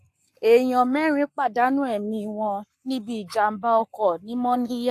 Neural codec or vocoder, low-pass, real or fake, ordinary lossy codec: codec, 44.1 kHz, 7.8 kbps, Pupu-Codec; 14.4 kHz; fake; AAC, 64 kbps